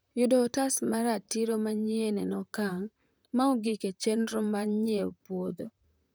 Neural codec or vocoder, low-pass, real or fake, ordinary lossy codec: vocoder, 44.1 kHz, 128 mel bands, Pupu-Vocoder; none; fake; none